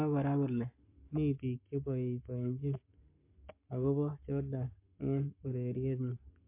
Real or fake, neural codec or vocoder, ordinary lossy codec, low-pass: fake; codec, 44.1 kHz, 7.8 kbps, Pupu-Codec; MP3, 24 kbps; 3.6 kHz